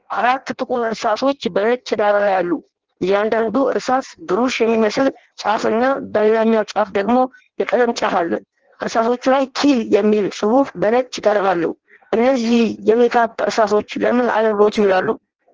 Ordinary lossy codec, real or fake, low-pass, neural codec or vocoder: Opus, 16 kbps; fake; 7.2 kHz; codec, 16 kHz in and 24 kHz out, 0.6 kbps, FireRedTTS-2 codec